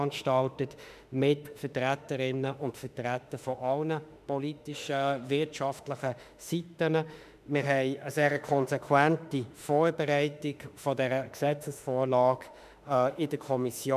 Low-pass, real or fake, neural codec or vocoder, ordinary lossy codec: 14.4 kHz; fake; autoencoder, 48 kHz, 32 numbers a frame, DAC-VAE, trained on Japanese speech; none